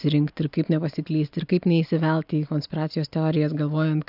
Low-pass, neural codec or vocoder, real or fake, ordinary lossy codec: 5.4 kHz; none; real; AAC, 48 kbps